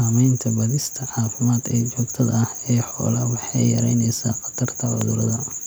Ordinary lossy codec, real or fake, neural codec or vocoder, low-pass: none; real; none; none